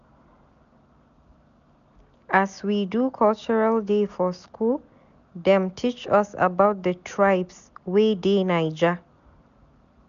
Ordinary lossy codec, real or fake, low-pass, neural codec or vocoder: none; real; 7.2 kHz; none